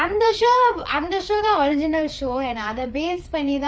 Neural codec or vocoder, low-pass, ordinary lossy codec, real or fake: codec, 16 kHz, 4 kbps, FreqCodec, larger model; none; none; fake